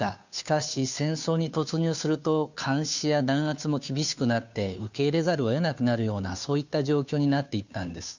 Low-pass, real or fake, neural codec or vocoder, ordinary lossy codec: 7.2 kHz; fake; codec, 16 kHz, 2 kbps, FunCodec, trained on Chinese and English, 25 frames a second; none